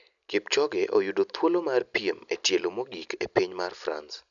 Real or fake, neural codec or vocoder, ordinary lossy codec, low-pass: real; none; none; 7.2 kHz